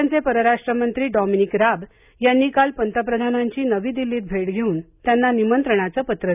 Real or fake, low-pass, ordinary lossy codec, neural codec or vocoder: real; 3.6 kHz; none; none